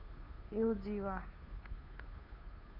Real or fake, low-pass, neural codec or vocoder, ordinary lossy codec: fake; 5.4 kHz; codec, 16 kHz, 2 kbps, FunCodec, trained on Chinese and English, 25 frames a second; none